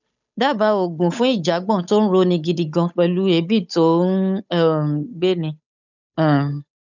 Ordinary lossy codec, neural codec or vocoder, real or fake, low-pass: none; codec, 16 kHz, 8 kbps, FunCodec, trained on Chinese and English, 25 frames a second; fake; 7.2 kHz